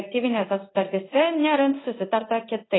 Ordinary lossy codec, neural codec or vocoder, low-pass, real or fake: AAC, 16 kbps; codec, 16 kHz in and 24 kHz out, 1 kbps, XY-Tokenizer; 7.2 kHz; fake